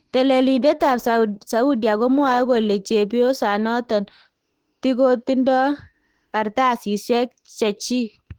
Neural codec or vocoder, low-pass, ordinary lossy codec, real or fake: autoencoder, 48 kHz, 32 numbers a frame, DAC-VAE, trained on Japanese speech; 19.8 kHz; Opus, 16 kbps; fake